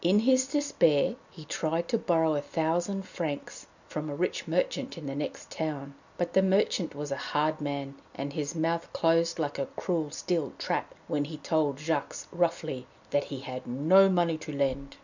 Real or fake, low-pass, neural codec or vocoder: real; 7.2 kHz; none